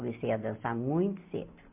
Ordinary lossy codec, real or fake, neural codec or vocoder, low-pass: MP3, 32 kbps; real; none; 3.6 kHz